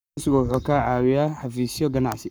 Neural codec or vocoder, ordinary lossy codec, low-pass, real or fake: codec, 44.1 kHz, 7.8 kbps, Pupu-Codec; none; none; fake